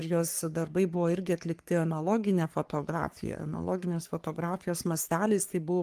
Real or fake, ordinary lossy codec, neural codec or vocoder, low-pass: fake; Opus, 32 kbps; codec, 44.1 kHz, 3.4 kbps, Pupu-Codec; 14.4 kHz